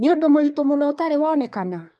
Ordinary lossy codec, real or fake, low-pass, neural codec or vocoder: none; fake; none; codec, 24 kHz, 1 kbps, SNAC